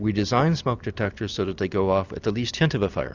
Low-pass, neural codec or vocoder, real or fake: 7.2 kHz; vocoder, 44.1 kHz, 128 mel bands every 256 samples, BigVGAN v2; fake